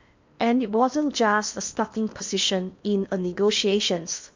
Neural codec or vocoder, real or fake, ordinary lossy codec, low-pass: codec, 16 kHz in and 24 kHz out, 0.8 kbps, FocalCodec, streaming, 65536 codes; fake; MP3, 64 kbps; 7.2 kHz